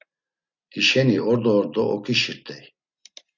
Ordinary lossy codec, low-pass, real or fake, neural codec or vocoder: Opus, 64 kbps; 7.2 kHz; real; none